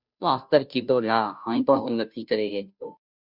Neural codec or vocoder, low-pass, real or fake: codec, 16 kHz, 0.5 kbps, FunCodec, trained on Chinese and English, 25 frames a second; 5.4 kHz; fake